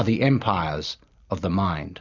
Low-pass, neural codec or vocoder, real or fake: 7.2 kHz; none; real